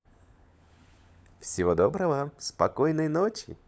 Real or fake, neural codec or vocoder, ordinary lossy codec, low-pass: fake; codec, 16 kHz, 16 kbps, FunCodec, trained on LibriTTS, 50 frames a second; none; none